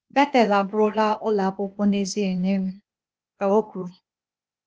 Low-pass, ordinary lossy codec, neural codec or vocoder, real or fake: none; none; codec, 16 kHz, 0.8 kbps, ZipCodec; fake